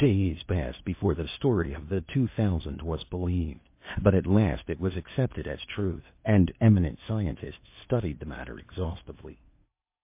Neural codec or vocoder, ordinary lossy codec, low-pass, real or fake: codec, 16 kHz in and 24 kHz out, 0.8 kbps, FocalCodec, streaming, 65536 codes; MP3, 24 kbps; 3.6 kHz; fake